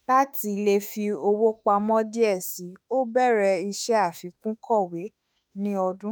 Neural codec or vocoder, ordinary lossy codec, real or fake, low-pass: autoencoder, 48 kHz, 32 numbers a frame, DAC-VAE, trained on Japanese speech; none; fake; none